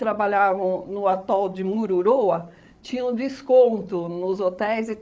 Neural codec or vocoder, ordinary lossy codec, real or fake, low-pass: codec, 16 kHz, 8 kbps, FreqCodec, larger model; none; fake; none